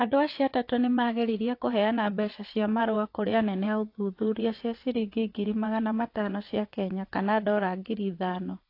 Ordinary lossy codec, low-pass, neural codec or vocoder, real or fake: AAC, 32 kbps; 5.4 kHz; vocoder, 22.05 kHz, 80 mel bands, WaveNeXt; fake